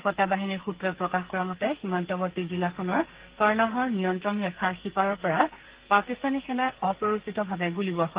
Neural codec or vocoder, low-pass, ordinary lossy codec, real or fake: codec, 44.1 kHz, 2.6 kbps, SNAC; 3.6 kHz; Opus, 16 kbps; fake